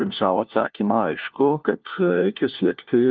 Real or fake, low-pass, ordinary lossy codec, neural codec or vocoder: fake; 7.2 kHz; Opus, 24 kbps; codec, 16 kHz, 1 kbps, FunCodec, trained on LibriTTS, 50 frames a second